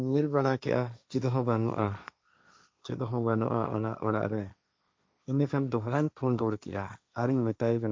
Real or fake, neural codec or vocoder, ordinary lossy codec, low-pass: fake; codec, 16 kHz, 1.1 kbps, Voila-Tokenizer; none; none